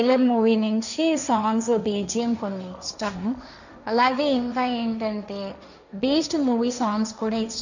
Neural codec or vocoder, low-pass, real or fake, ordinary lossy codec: codec, 16 kHz, 1.1 kbps, Voila-Tokenizer; 7.2 kHz; fake; none